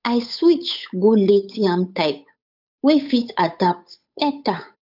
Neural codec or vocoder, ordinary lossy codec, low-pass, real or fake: codec, 16 kHz, 8 kbps, FunCodec, trained on Chinese and English, 25 frames a second; none; 5.4 kHz; fake